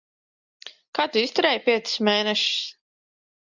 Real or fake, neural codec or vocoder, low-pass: real; none; 7.2 kHz